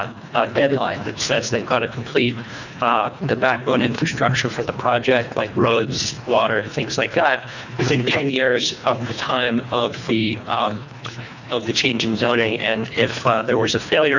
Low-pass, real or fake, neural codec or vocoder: 7.2 kHz; fake; codec, 24 kHz, 1.5 kbps, HILCodec